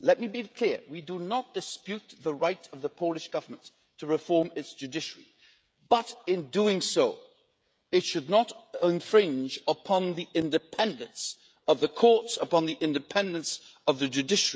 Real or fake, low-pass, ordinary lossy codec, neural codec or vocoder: fake; none; none; codec, 16 kHz, 16 kbps, FreqCodec, smaller model